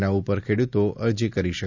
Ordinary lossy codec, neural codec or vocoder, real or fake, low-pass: none; none; real; 7.2 kHz